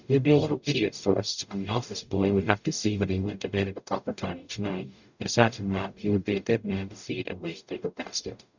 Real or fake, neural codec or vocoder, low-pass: fake; codec, 44.1 kHz, 0.9 kbps, DAC; 7.2 kHz